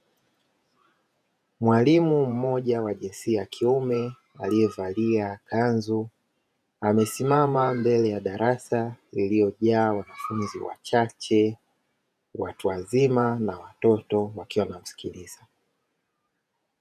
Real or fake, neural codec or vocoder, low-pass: fake; vocoder, 48 kHz, 128 mel bands, Vocos; 14.4 kHz